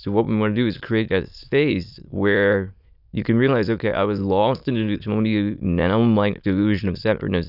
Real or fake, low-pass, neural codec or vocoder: fake; 5.4 kHz; autoencoder, 22.05 kHz, a latent of 192 numbers a frame, VITS, trained on many speakers